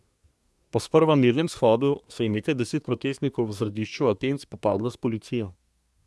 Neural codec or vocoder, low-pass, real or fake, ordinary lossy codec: codec, 24 kHz, 1 kbps, SNAC; none; fake; none